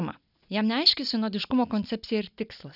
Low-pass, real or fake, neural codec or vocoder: 5.4 kHz; real; none